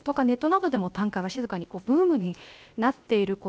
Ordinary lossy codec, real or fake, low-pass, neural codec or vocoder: none; fake; none; codec, 16 kHz, 0.7 kbps, FocalCodec